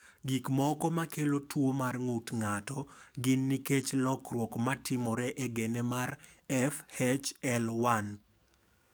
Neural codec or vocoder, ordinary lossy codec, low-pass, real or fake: codec, 44.1 kHz, 7.8 kbps, DAC; none; none; fake